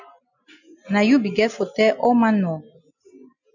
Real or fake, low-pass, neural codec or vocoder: real; 7.2 kHz; none